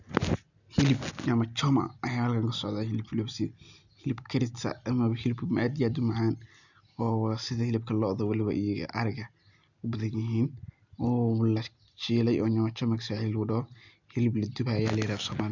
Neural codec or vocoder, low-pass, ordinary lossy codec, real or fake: none; 7.2 kHz; none; real